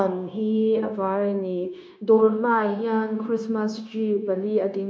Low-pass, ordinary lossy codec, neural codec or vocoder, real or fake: none; none; codec, 16 kHz, 0.9 kbps, LongCat-Audio-Codec; fake